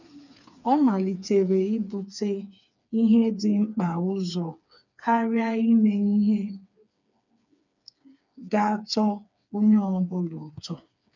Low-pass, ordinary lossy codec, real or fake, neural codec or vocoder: 7.2 kHz; none; fake; codec, 16 kHz, 4 kbps, FreqCodec, smaller model